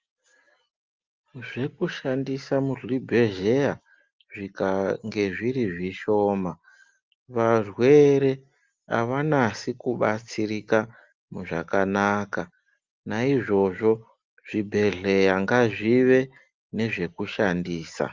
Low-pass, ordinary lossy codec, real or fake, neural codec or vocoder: 7.2 kHz; Opus, 32 kbps; real; none